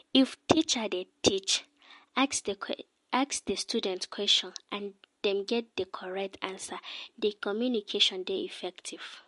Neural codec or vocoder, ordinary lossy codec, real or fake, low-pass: none; MP3, 48 kbps; real; 14.4 kHz